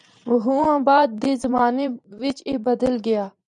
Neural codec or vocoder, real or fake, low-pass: vocoder, 44.1 kHz, 128 mel bands every 512 samples, BigVGAN v2; fake; 10.8 kHz